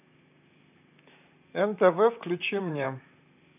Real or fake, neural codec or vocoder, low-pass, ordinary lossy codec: fake; vocoder, 44.1 kHz, 128 mel bands, Pupu-Vocoder; 3.6 kHz; none